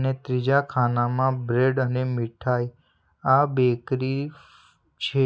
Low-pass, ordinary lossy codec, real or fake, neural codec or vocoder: none; none; real; none